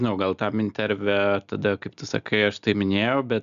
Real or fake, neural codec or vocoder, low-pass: real; none; 7.2 kHz